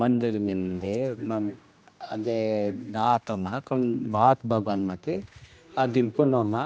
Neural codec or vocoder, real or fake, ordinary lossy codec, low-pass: codec, 16 kHz, 1 kbps, X-Codec, HuBERT features, trained on general audio; fake; none; none